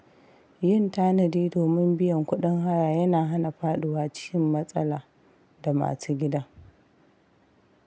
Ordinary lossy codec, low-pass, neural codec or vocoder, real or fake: none; none; none; real